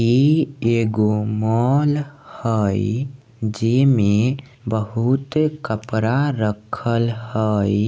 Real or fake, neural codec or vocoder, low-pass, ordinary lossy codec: real; none; none; none